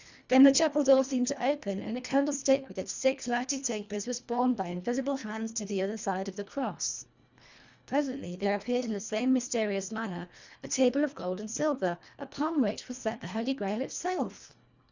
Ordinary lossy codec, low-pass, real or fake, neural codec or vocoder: Opus, 64 kbps; 7.2 kHz; fake; codec, 24 kHz, 1.5 kbps, HILCodec